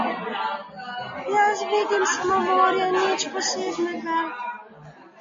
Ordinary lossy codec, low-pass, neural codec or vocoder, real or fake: MP3, 32 kbps; 7.2 kHz; none; real